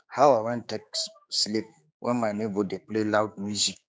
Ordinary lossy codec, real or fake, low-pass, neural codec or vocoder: none; fake; none; codec, 16 kHz, 4 kbps, X-Codec, HuBERT features, trained on general audio